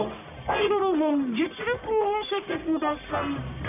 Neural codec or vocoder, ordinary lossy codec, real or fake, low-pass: codec, 44.1 kHz, 1.7 kbps, Pupu-Codec; none; fake; 3.6 kHz